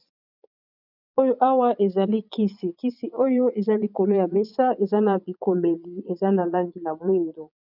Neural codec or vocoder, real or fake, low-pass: vocoder, 22.05 kHz, 80 mel bands, Vocos; fake; 5.4 kHz